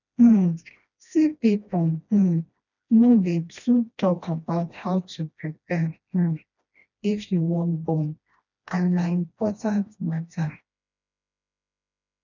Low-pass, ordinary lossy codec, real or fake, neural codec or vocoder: 7.2 kHz; none; fake; codec, 16 kHz, 1 kbps, FreqCodec, smaller model